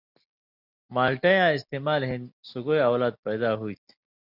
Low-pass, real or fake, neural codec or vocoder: 5.4 kHz; real; none